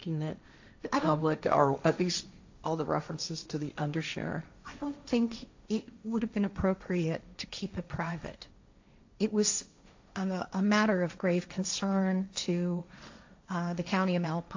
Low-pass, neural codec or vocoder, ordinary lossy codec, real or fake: 7.2 kHz; codec, 16 kHz, 1.1 kbps, Voila-Tokenizer; AAC, 48 kbps; fake